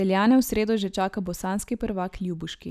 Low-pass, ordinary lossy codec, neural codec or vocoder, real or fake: 14.4 kHz; none; none; real